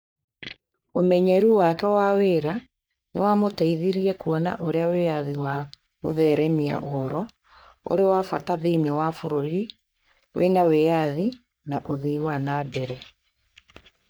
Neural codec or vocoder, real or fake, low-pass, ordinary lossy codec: codec, 44.1 kHz, 3.4 kbps, Pupu-Codec; fake; none; none